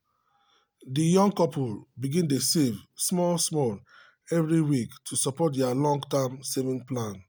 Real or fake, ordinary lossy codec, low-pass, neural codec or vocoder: real; none; none; none